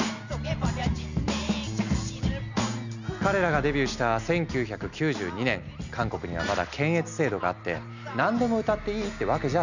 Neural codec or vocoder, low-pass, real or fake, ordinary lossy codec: none; 7.2 kHz; real; none